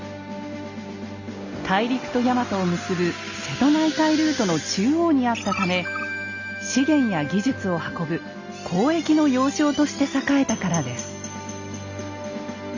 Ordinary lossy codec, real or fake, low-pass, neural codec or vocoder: Opus, 64 kbps; real; 7.2 kHz; none